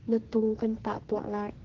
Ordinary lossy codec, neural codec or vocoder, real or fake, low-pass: Opus, 16 kbps; codec, 44.1 kHz, 2.6 kbps, SNAC; fake; 7.2 kHz